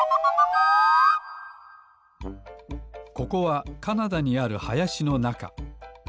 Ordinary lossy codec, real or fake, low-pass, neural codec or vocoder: none; real; none; none